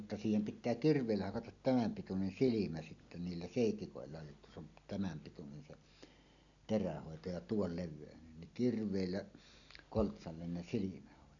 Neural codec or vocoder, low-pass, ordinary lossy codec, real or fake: none; 7.2 kHz; none; real